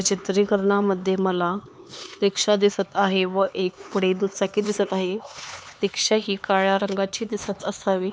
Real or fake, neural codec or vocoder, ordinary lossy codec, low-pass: fake; codec, 16 kHz, 4 kbps, X-Codec, HuBERT features, trained on LibriSpeech; none; none